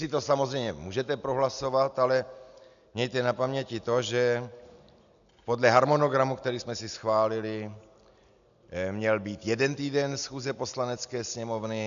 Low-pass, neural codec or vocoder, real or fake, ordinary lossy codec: 7.2 kHz; none; real; MP3, 96 kbps